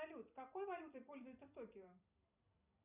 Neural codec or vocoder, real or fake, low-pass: vocoder, 44.1 kHz, 128 mel bands every 256 samples, BigVGAN v2; fake; 3.6 kHz